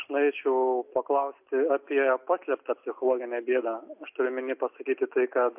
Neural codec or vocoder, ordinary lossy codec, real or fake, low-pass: none; MP3, 32 kbps; real; 3.6 kHz